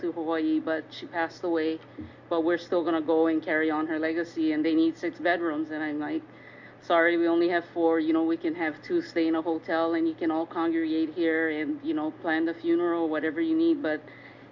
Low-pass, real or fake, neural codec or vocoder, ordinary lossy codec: 7.2 kHz; real; none; MP3, 64 kbps